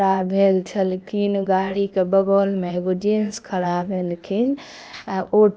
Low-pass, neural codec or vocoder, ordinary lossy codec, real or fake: none; codec, 16 kHz, 0.8 kbps, ZipCodec; none; fake